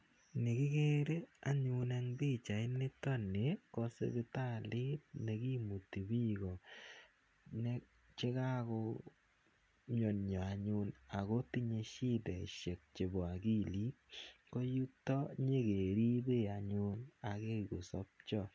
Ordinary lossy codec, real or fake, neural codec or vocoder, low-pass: none; real; none; none